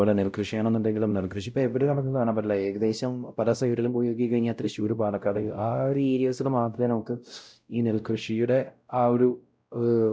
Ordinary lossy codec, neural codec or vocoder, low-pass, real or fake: none; codec, 16 kHz, 0.5 kbps, X-Codec, WavLM features, trained on Multilingual LibriSpeech; none; fake